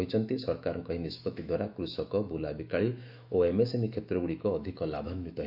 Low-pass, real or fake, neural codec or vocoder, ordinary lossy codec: 5.4 kHz; fake; autoencoder, 48 kHz, 128 numbers a frame, DAC-VAE, trained on Japanese speech; none